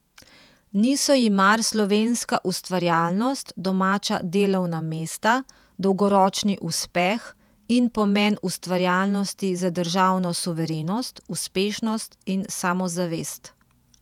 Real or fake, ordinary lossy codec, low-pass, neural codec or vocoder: fake; none; 19.8 kHz; vocoder, 48 kHz, 128 mel bands, Vocos